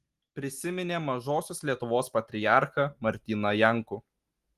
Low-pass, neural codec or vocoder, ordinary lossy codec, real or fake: 14.4 kHz; none; Opus, 24 kbps; real